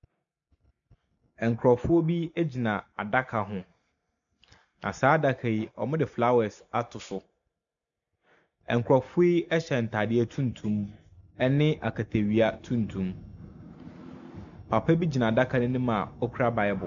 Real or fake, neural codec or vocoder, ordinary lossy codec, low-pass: real; none; MP3, 96 kbps; 7.2 kHz